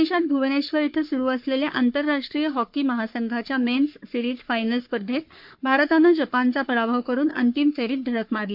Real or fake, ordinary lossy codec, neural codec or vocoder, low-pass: fake; MP3, 48 kbps; codec, 44.1 kHz, 3.4 kbps, Pupu-Codec; 5.4 kHz